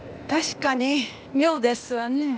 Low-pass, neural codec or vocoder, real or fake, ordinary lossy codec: none; codec, 16 kHz, 0.8 kbps, ZipCodec; fake; none